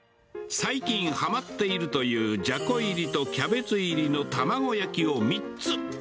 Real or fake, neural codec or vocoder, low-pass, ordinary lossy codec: real; none; none; none